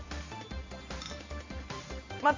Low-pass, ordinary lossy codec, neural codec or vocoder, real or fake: 7.2 kHz; MP3, 48 kbps; none; real